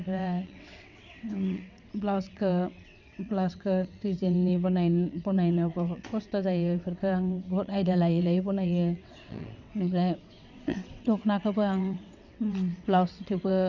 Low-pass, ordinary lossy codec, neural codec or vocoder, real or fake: 7.2 kHz; none; vocoder, 22.05 kHz, 80 mel bands, WaveNeXt; fake